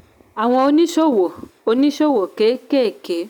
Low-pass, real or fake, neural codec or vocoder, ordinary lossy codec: 19.8 kHz; real; none; none